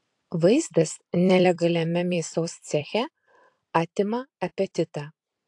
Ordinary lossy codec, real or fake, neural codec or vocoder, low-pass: AAC, 64 kbps; fake; vocoder, 44.1 kHz, 128 mel bands every 256 samples, BigVGAN v2; 10.8 kHz